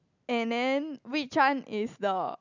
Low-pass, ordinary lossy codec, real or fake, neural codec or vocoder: 7.2 kHz; none; real; none